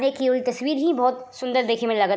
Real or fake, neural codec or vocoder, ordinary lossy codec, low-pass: real; none; none; none